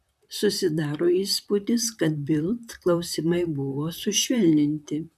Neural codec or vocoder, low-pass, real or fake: vocoder, 44.1 kHz, 128 mel bands, Pupu-Vocoder; 14.4 kHz; fake